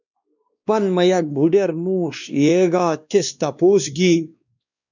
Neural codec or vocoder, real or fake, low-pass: codec, 16 kHz, 2 kbps, X-Codec, WavLM features, trained on Multilingual LibriSpeech; fake; 7.2 kHz